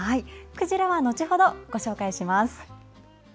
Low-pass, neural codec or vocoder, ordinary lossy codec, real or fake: none; none; none; real